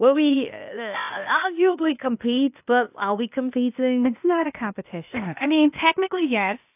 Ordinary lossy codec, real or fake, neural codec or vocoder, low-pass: AAC, 32 kbps; fake; codec, 16 kHz, 0.8 kbps, ZipCodec; 3.6 kHz